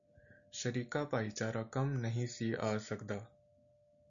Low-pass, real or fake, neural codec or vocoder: 7.2 kHz; real; none